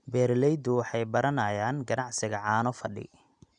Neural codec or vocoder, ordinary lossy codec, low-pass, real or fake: none; none; 9.9 kHz; real